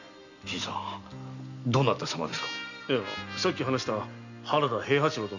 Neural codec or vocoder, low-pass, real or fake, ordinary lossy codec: none; 7.2 kHz; real; none